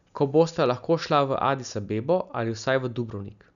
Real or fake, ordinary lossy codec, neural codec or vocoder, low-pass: real; none; none; 7.2 kHz